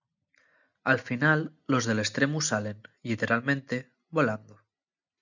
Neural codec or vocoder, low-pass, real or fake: none; 7.2 kHz; real